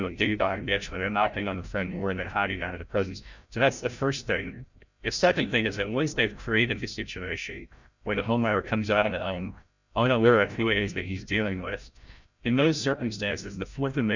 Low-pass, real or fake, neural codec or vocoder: 7.2 kHz; fake; codec, 16 kHz, 0.5 kbps, FreqCodec, larger model